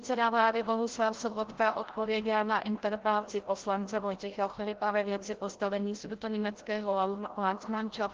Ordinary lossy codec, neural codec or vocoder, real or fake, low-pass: Opus, 16 kbps; codec, 16 kHz, 0.5 kbps, FreqCodec, larger model; fake; 7.2 kHz